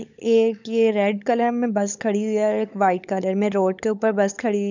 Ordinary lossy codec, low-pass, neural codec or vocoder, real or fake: none; 7.2 kHz; codec, 16 kHz, 16 kbps, FunCodec, trained on LibriTTS, 50 frames a second; fake